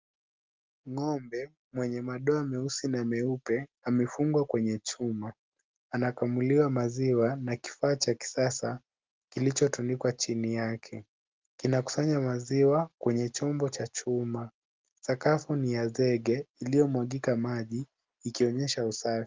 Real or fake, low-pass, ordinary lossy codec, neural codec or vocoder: real; 7.2 kHz; Opus, 32 kbps; none